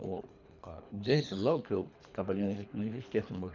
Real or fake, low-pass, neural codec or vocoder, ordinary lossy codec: fake; 7.2 kHz; codec, 24 kHz, 3 kbps, HILCodec; Opus, 64 kbps